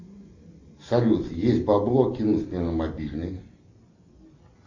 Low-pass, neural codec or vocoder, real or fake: 7.2 kHz; none; real